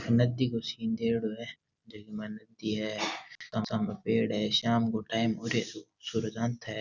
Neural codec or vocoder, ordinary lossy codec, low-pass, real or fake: none; none; 7.2 kHz; real